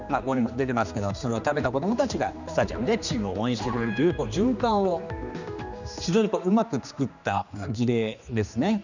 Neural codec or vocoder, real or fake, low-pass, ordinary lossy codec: codec, 16 kHz, 2 kbps, X-Codec, HuBERT features, trained on general audio; fake; 7.2 kHz; none